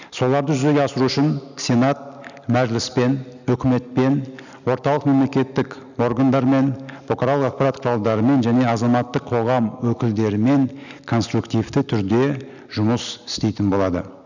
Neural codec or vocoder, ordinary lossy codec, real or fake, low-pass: none; none; real; 7.2 kHz